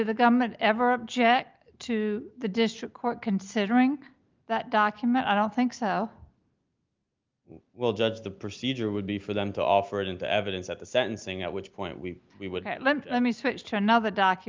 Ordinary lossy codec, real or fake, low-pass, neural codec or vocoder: Opus, 24 kbps; real; 7.2 kHz; none